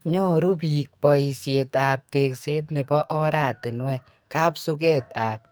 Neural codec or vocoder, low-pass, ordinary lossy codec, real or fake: codec, 44.1 kHz, 2.6 kbps, SNAC; none; none; fake